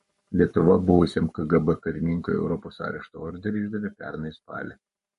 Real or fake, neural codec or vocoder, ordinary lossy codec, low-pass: fake; codec, 44.1 kHz, 7.8 kbps, Pupu-Codec; MP3, 48 kbps; 14.4 kHz